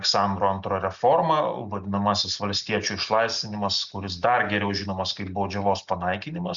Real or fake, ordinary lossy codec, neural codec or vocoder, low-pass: real; Opus, 64 kbps; none; 7.2 kHz